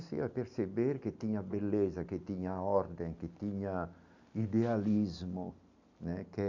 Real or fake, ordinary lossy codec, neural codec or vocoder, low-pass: real; none; none; 7.2 kHz